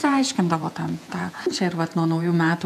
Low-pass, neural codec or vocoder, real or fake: 14.4 kHz; vocoder, 48 kHz, 128 mel bands, Vocos; fake